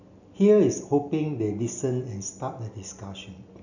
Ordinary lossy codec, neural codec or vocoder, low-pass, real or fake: none; none; 7.2 kHz; real